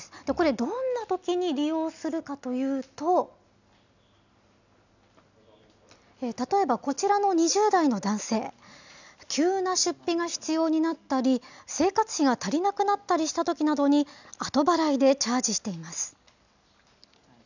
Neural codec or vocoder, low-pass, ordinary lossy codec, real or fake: none; 7.2 kHz; none; real